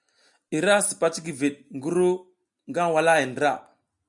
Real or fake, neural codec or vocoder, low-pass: real; none; 10.8 kHz